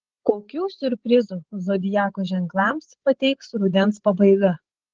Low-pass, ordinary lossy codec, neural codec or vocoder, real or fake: 7.2 kHz; Opus, 16 kbps; codec, 16 kHz, 16 kbps, FreqCodec, larger model; fake